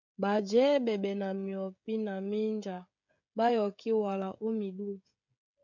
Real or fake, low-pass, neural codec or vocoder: fake; 7.2 kHz; codec, 16 kHz, 16 kbps, FreqCodec, smaller model